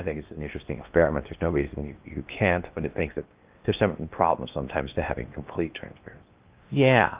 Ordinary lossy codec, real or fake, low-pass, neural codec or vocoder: Opus, 32 kbps; fake; 3.6 kHz; codec, 16 kHz in and 24 kHz out, 0.8 kbps, FocalCodec, streaming, 65536 codes